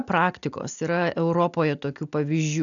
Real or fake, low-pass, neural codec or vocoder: real; 7.2 kHz; none